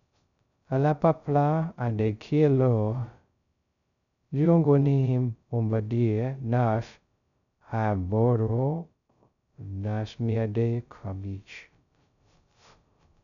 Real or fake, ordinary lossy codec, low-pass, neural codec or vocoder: fake; none; 7.2 kHz; codec, 16 kHz, 0.2 kbps, FocalCodec